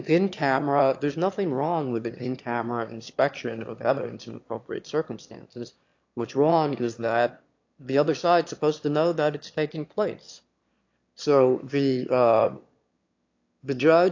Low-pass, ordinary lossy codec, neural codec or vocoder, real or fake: 7.2 kHz; AAC, 48 kbps; autoencoder, 22.05 kHz, a latent of 192 numbers a frame, VITS, trained on one speaker; fake